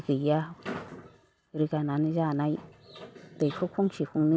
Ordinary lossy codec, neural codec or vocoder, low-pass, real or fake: none; none; none; real